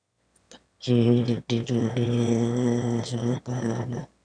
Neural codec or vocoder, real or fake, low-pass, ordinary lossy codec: autoencoder, 22.05 kHz, a latent of 192 numbers a frame, VITS, trained on one speaker; fake; 9.9 kHz; none